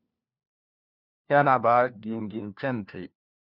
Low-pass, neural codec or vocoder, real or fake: 5.4 kHz; codec, 16 kHz, 1 kbps, FunCodec, trained on LibriTTS, 50 frames a second; fake